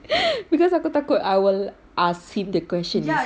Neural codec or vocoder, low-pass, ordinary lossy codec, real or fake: none; none; none; real